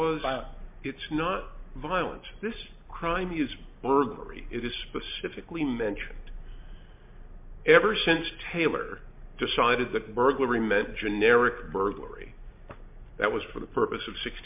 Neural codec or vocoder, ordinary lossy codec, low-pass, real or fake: none; MP3, 32 kbps; 3.6 kHz; real